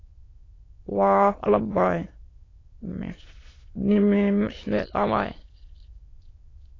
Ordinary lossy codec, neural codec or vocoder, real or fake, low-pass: AAC, 32 kbps; autoencoder, 22.05 kHz, a latent of 192 numbers a frame, VITS, trained on many speakers; fake; 7.2 kHz